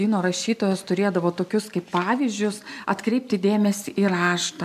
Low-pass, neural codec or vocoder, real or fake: 14.4 kHz; none; real